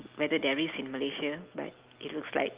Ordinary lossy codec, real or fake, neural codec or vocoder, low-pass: Opus, 32 kbps; real; none; 3.6 kHz